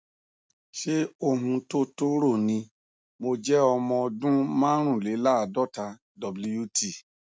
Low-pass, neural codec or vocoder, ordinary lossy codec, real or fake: none; none; none; real